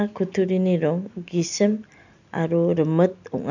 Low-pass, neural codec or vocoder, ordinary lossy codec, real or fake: 7.2 kHz; none; none; real